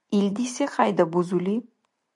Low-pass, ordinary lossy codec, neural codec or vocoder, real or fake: 10.8 kHz; MP3, 96 kbps; none; real